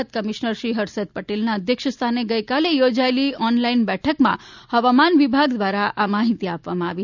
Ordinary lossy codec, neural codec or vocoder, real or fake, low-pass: none; none; real; 7.2 kHz